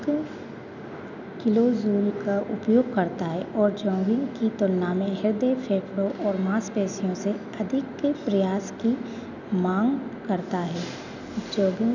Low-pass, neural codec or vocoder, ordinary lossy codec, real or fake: 7.2 kHz; none; none; real